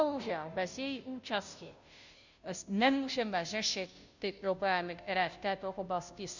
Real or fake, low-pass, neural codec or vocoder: fake; 7.2 kHz; codec, 16 kHz, 0.5 kbps, FunCodec, trained on Chinese and English, 25 frames a second